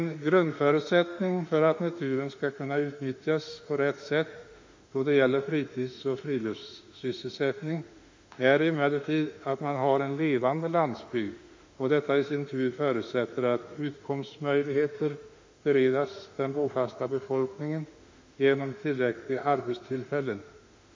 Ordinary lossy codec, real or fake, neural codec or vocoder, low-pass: MP3, 48 kbps; fake; autoencoder, 48 kHz, 32 numbers a frame, DAC-VAE, trained on Japanese speech; 7.2 kHz